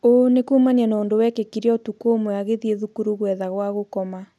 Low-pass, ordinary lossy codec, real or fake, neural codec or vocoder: none; none; real; none